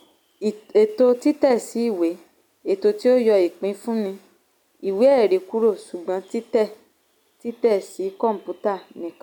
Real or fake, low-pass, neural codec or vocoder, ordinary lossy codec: real; 19.8 kHz; none; none